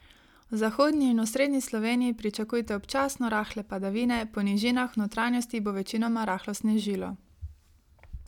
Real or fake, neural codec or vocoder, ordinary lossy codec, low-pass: real; none; none; 19.8 kHz